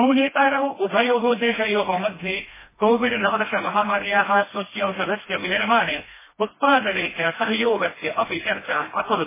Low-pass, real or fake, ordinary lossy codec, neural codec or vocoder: 3.6 kHz; fake; MP3, 16 kbps; codec, 16 kHz, 1 kbps, FreqCodec, smaller model